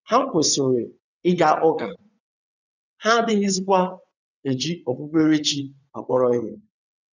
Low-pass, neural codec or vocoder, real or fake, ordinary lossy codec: 7.2 kHz; vocoder, 22.05 kHz, 80 mel bands, WaveNeXt; fake; none